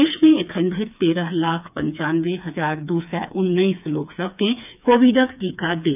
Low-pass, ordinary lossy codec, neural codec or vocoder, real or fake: 3.6 kHz; none; codec, 16 kHz, 4 kbps, FreqCodec, smaller model; fake